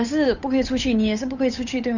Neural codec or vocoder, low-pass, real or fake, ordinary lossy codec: codec, 16 kHz, 8 kbps, FunCodec, trained on Chinese and English, 25 frames a second; 7.2 kHz; fake; none